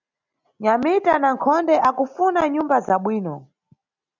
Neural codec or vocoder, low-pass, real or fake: none; 7.2 kHz; real